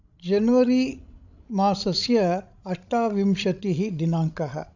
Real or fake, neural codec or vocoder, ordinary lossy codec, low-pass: fake; codec, 16 kHz, 16 kbps, FreqCodec, larger model; none; 7.2 kHz